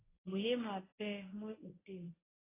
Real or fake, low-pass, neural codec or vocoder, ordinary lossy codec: fake; 3.6 kHz; codec, 24 kHz, 0.9 kbps, WavTokenizer, medium speech release version 1; AAC, 16 kbps